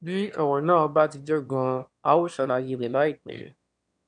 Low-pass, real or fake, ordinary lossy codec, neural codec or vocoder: 9.9 kHz; fake; none; autoencoder, 22.05 kHz, a latent of 192 numbers a frame, VITS, trained on one speaker